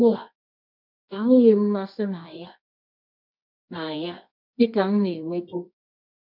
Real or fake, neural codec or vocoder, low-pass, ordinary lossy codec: fake; codec, 24 kHz, 0.9 kbps, WavTokenizer, medium music audio release; 5.4 kHz; none